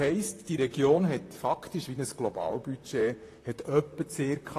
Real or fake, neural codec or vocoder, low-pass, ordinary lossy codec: fake; vocoder, 44.1 kHz, 128 mel bands, Pupu-Vocoder; 14.4 kHz; AAC, 48 kbps